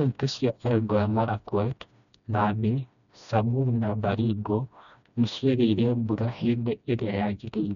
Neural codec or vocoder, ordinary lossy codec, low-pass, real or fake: codec, 16 kHz, 1 kbps, FreqCodec, smaller model; none; 7.2 kHz; fake